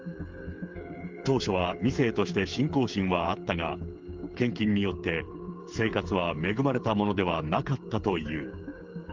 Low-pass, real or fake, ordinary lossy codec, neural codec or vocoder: 7.2 kHz; fake; Opus, 32 kbps; codec, 16 kHz, 8 kbps, FreqCodec, smaller model